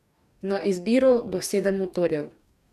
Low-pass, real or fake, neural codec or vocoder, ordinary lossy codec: 14.4 kHz; fake; codec, 44.1 kHz, 2.6 kbps, DAC; none